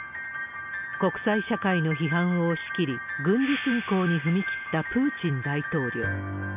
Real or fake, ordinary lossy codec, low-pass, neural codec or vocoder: real; none; 3.6 kHz; none